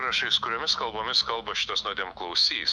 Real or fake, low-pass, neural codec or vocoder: fake; 10.8 kHz; autoencoder, 48 kHz, 128 numbers a frame, DAC-VAE, trained on Japanese speech